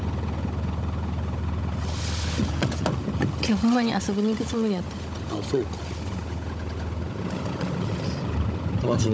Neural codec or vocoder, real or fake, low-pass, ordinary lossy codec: codec, 16 kHz, 16 kbps, FunCodec, trained on Chinese and English, 50 frames a second; fake; none; none